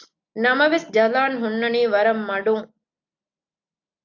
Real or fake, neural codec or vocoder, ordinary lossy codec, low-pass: real; none; AAC, 48 kbps; 7.2 kHz